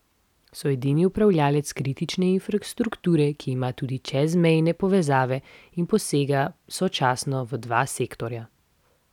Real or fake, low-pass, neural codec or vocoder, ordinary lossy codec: real; 19.8 kHz; none; none